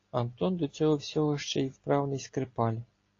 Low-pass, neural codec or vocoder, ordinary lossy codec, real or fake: 7.2 kHz; none; AAC, 32 kbps; real